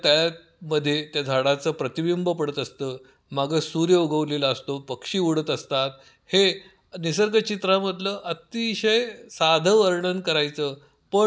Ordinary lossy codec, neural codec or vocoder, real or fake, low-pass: none; none; real; none